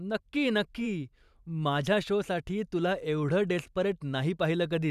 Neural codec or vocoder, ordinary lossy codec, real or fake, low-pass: vocoder, 44.1 kHz, 128 mel bands every 512 samples, BigVGAN v2; none; fake; 14.4 kHz